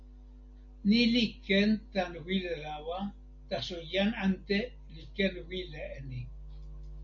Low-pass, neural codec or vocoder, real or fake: 7.2 kHz; none; real